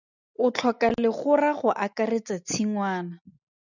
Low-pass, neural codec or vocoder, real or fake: 7.2 kHz; none; real